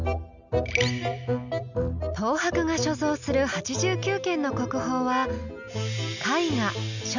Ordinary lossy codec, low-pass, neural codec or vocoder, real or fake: none; 7.2 kHz; none; real